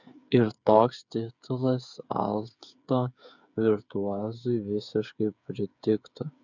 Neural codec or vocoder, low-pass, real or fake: codec, 16 kHz, 8 kbps, FreqCodec, smaller model; 7.2 kHz; fake